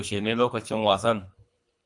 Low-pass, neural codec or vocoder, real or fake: 10.8 kHz; codec, 24 kHz, 3 kbps, HILCodec; fake